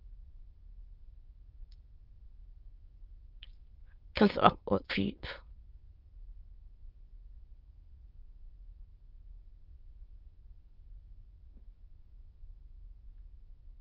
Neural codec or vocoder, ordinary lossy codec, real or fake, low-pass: autoencoder, 22.05 kHz, a latent of 192 numbers a frame, VITS, trained on many speakers; Opus, 32 kbps; fake; 5.4 kHz